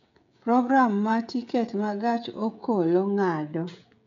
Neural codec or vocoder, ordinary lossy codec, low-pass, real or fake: codec, 16 kHz, 16 kbps, FreqCodec, smaller model; MP3, 64 kbps; 7.2 kHz; fake